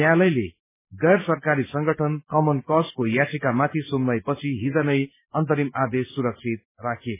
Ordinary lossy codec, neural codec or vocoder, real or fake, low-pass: MP3, 16 kbps; none; real; 3.6 kHz